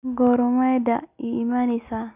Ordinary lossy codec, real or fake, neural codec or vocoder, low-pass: none; real; none; 3.6 kHz